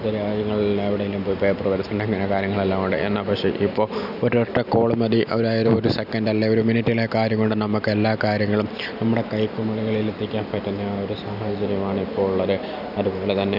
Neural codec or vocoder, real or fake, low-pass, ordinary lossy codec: none; real; 5.4 kHz; none